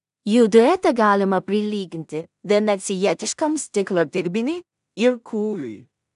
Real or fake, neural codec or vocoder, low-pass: fake; codec, 16 kHz in and 24 kHz out, 0.4 kbps, LongCat-Audio-Codec, two codebook decoder; 10.8 kHz